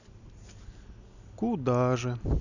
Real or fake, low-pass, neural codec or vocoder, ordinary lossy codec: real; 7.2 kHz; none; none